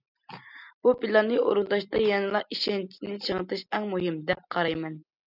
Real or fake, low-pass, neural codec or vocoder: real; 5.4 kHz; none